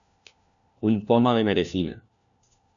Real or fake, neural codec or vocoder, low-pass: fake; codec, 16 kHz, 1 kbps, FunCodec, trained on LibriTTS, 50 frames a second; 7.2 kHz